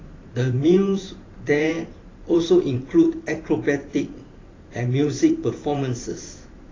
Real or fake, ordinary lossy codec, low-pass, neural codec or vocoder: fake; AAC, 32 kbps; 7.2 kHz; vocoder, 44.1 kHz, 128 mel bands every 512 samples, BigVGAN v2